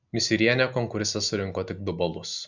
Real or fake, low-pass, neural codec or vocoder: real; 7.2 kHz; none